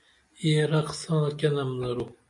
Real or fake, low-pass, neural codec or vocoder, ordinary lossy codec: real; 10.8 kHz; none; MP3, 48 kbps